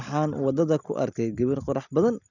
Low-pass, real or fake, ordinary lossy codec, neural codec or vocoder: 7.2 kHz; real; none; none